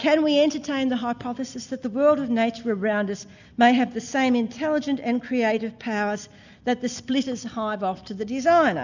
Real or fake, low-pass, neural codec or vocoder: real; 7.2 kHz; none